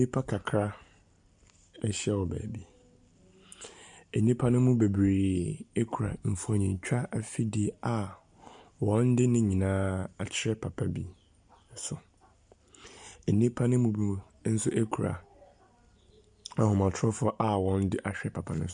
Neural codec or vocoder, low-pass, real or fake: none; 9.9 kHz; real